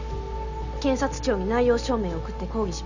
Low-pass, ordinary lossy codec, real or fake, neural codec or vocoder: 7.2 kHz; none; real; none